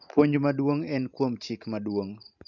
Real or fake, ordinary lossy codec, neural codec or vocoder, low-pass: fake; none; vocoder, 44.1 kHz, 128 mel bands every 512 samples, BigVGAN v2; 7.2 kHz